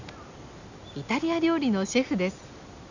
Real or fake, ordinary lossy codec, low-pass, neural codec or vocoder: real; none; 7.2 kHz; none